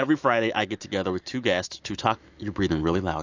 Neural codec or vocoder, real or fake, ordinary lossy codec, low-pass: autoencoder, 48 kHz, 128 numbers a frame, DAC-VAE, trained on Japanese speech; fake; AAC, 48 kbps; 7.2 kHz